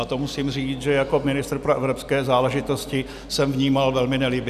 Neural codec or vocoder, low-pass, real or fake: none; 14.4 kHz; real